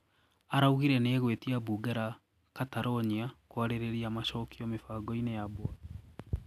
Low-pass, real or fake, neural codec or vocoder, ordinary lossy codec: 14.4 kHz; real; none; none